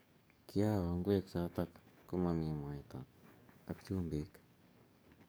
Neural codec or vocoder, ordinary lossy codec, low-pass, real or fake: codec, 44.1 kHz, 7.8 kbps, DAC; none; none; fake